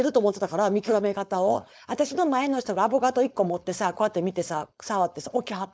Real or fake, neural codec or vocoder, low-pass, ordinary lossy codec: fake; codec, 16 kHz, 4.8 kbps, FACodec; none; none